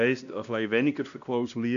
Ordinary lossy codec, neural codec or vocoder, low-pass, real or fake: none; codec, 16 kHz, 1 kbps, X-Codec, WavLM features, trained on Multilingual LibriSpeech; 7.2 kHz; fake